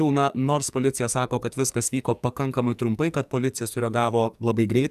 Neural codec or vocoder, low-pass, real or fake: codec, 44.1 kHz, 2.6 kbps, SNAC; 14.4 kHz; fake